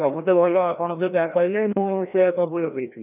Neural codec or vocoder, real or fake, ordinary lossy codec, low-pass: codec, 16 kHz, 1 kbps, FreqCodec, larger model; fake; none; 3.6 kHz